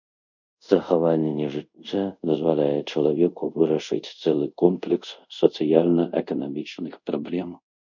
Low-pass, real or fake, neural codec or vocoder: 7.2 kHz; fake; codec, 24 kHz, 0.5 kbps, DualCodec